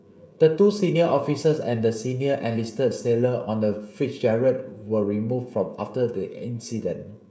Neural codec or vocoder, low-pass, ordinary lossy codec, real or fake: codec, 16 kHz, 16 kbps, FreqCodec, smaller model; none; none; fake